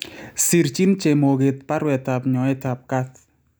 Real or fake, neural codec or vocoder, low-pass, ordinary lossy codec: real; none; none; none